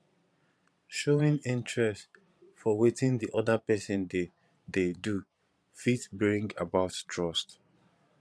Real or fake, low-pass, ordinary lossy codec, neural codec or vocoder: fake; none; none; vocoder, 22.05 kHz, 80 mel bands, Vocos